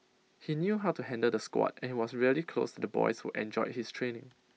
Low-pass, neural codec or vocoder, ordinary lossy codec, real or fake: none; none; none; real